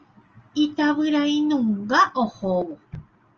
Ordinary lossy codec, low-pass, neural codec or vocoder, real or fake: Opus, 24 kbps; 7.2 kHz; none; real